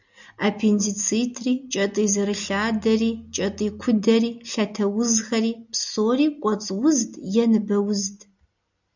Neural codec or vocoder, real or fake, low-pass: none; real; 7.2 kHz